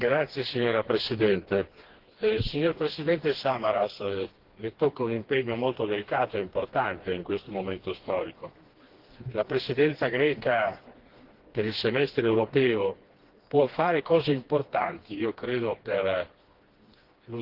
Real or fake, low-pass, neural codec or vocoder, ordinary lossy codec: fake; 5.4 kHz; codec, 16 kHz, 2 kbps, FreqCodec, smaller model; Opus, 16 kbps